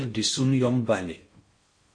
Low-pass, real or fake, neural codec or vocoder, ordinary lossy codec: 9.9 kHz; fake; codec, 16 kHz in and 24 kHz out, 0.6 kbps, FocalCodec, streaming, 4096 codes; MP3, 48 kbps